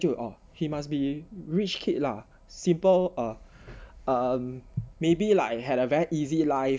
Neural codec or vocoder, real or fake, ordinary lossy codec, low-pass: none; real; none; none